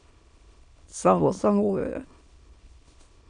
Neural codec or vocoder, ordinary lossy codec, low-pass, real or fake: autoencoder, 22.05 kHz, a latent of 192 numbers a frame, VITS, trained on many speakers; MP3, 64 kbps; 9.9 kHz; fake